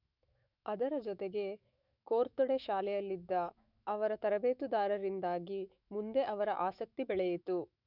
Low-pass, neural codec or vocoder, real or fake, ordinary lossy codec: 5.4 kHz; autoencoder, 48 kHz, 128 numbers a frame, DAC-VAE, trained on Japanese speech; fake; none